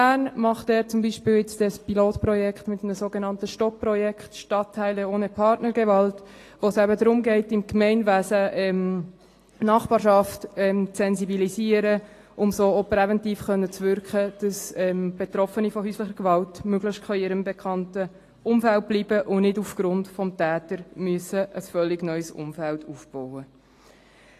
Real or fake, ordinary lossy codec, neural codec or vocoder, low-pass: real; AAC, 48 kbps; none; 14.4 kHz